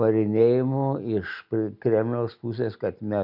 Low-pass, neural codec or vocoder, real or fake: 5.4 kHz; none; real